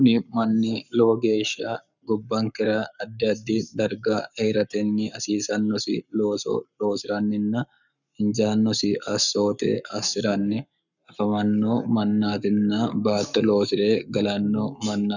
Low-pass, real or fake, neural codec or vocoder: 7.2 kHz; fake; codec, 44.1 kHz, 7.8 kbps, Pupu-Codec